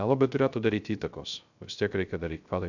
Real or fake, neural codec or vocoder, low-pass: fake; codec, 16 kHz, 0.3 kbps, FocalCodec; 7.2 kHz